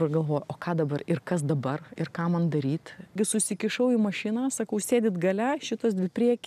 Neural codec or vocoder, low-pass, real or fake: none; 14.4 kHz; real